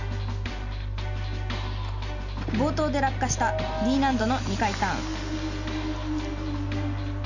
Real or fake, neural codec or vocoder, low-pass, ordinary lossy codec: real; none; 7.2 kHz; none